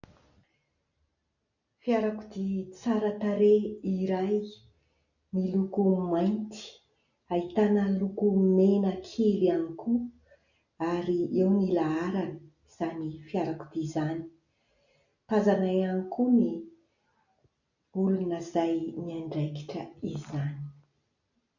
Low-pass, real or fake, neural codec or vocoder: 7.2 kHz; real; none